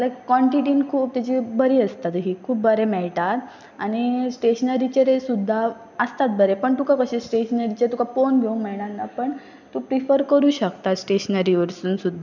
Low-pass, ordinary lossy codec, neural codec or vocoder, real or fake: 7.2 kHz; none; none; real